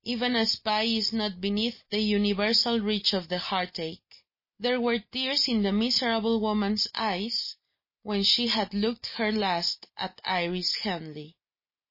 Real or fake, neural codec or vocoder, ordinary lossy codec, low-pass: real; none; MP3, 24 kbps; 5.4 kHz